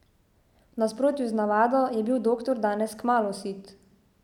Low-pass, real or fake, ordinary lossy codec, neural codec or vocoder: 19.8 kHz; real; none; none